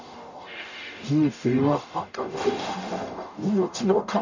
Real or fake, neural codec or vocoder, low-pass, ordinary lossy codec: fake; codec, 44.1 kHz, 0.9 kbps, DAC; 7.2 kHz; none